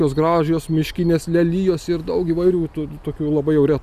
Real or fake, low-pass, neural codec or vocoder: real; 14.4 kHz; none